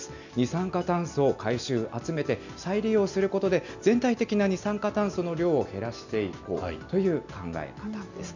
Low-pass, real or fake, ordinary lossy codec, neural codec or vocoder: 7.2 kHz; real; none; none